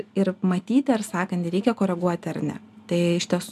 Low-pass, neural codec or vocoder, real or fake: 14.4 kHz; none; real